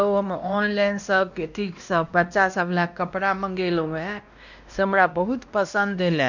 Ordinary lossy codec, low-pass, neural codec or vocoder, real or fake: none; 7.2 kHz; codec, 16 kHz, 1 kbps, X-Codec, WavLM features, trained on Multilingual LibriSpeech; fake